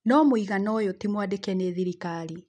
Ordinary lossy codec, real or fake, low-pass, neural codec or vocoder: none; real; 9.9 kHz; none